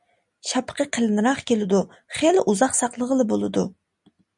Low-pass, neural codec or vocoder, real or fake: 10.8 kHz; none; real